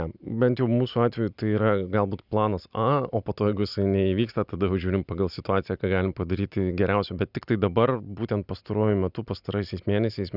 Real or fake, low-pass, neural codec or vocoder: real; 5.4 kHz; none